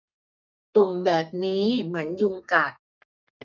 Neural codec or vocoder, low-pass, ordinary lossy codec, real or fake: codec, 32 kHz, 1.9 kbps, SNAC; 7.2 kHz; none; fake